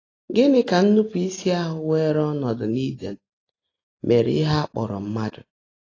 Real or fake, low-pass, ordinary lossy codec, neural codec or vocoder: real; 7.2 kHz; AAC, 32 kbps; none